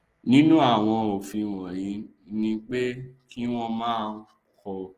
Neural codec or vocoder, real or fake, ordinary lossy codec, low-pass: none; real; Opus, 16 kbps; 14.4 kHz